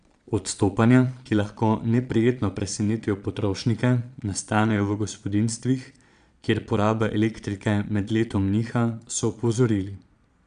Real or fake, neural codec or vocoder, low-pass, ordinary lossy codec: fake; vocoder, 22.05 kHz, 80 mel bands, Vocos; 9.9 kHz; none